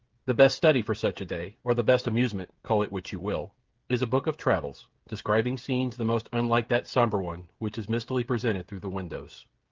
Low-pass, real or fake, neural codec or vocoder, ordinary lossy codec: 7.2 kHz; fake; codec, 16 kHz, 16 kbps, FreqCodec, smaller model; Opus, 16 kbps